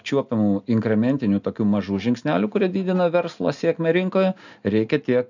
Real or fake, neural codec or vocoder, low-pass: real; none; 7.2 kHz